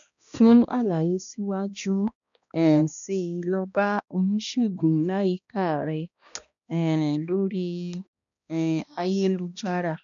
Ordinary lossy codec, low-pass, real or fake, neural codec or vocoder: none; 7.2 kHz; fake; codec, 16 kHz, 1 kbps, X-Codec, HuBERT features, trained on balanced general audio